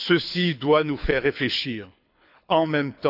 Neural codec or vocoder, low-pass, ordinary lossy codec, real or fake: codec, 24 kHz, 6 kbps, HILCodec; 5.4 kHz; none; fake